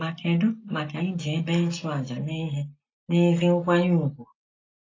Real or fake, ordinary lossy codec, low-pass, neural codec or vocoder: real; AAC, 32 kbps; 7.2 kHz; none